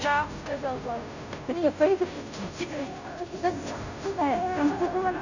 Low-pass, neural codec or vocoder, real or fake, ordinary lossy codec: 7.2 kHz; codec, 16 kHz, 0.5 kbps, FunCodec, trained on Chinese and English, 25 frames a second; fake; none